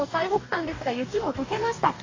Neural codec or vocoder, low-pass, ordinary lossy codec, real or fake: codec, 44.1 kHz, 2.6 kbps, DAC; 7.2 kHz; AAC, 32 kbps; fake